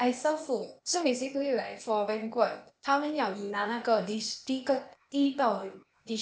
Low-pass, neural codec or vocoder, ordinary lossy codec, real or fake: none; codec, 16 kHz, 0.8 kbps, ZipCodec; none; fake